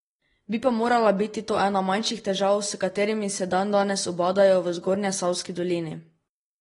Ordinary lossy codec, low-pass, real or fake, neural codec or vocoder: AAC, 32 kbps; 10.8 kHz; real; none